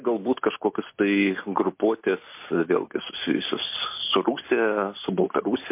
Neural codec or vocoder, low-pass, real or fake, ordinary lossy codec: none; 3.6 kHz; real; MP3, 24 kbps